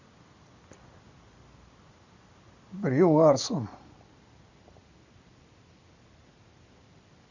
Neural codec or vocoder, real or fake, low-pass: none; real; 7.2 kHz